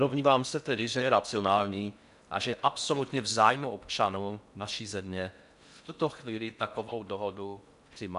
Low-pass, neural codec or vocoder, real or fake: 10.8 kHz; codec, 16 kHz in and 24 kHz out, 0.6 kbps, FocalCodec, streaming, 4096 codes; fake